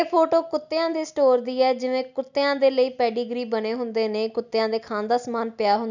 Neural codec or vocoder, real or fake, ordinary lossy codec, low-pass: none; real; none; 7.2 kHz